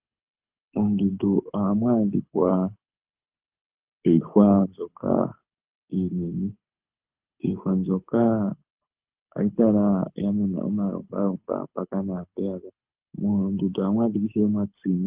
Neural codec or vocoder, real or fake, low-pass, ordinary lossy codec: codec, 24 kHz, 6 kbps, HILCodec; fake; 3.6 kHz; Opus, 32 kbps